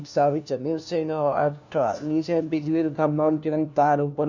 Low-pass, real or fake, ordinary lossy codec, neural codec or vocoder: 7.2 kHz; fake; none; codec, 16 kHz, 1 kbps, FunCodec, trained on LibriTTS, 50 frames a second